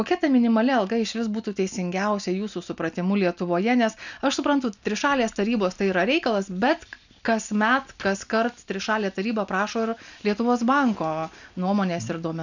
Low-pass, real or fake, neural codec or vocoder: 7.2 kHz; real; none